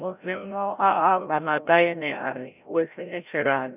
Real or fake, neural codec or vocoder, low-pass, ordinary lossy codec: fake; codec, 16 kHz, 0.5 kbps, FreqCodec, larger model; 3.6 kHz; none